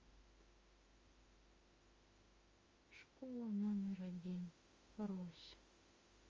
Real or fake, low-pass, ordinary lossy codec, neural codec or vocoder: fake; 7.2 kHz; Opus, 32 kbps; autoencoder, 48 kHz, 32 numbers a frame, DAC-VAE, trained on Japanese speech